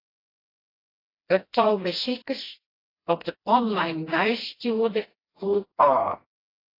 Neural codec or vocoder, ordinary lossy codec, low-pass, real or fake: codec, 16 kHz, 1 kbps, FreqCodec, smaller model; AAC, 24 kbps; 5.4 kHz; fake